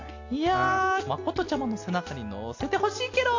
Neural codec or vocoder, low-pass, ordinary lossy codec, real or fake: none; 7.2 kHz; AAC, 48 kbps; real